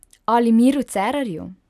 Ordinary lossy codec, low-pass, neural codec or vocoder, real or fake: none; 14.4 kHz; none; real